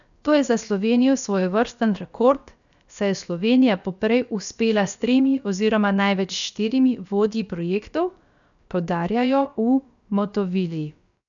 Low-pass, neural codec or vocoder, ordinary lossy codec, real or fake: 7.2 kHz; codec, 16 kHz, about 1 kbps, DyCAST, with the encoder's durations; none; fake